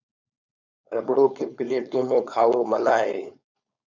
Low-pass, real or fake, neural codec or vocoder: 7.2 kHz; fake; codec, 16 kHz, 4.8 kbps, FACodec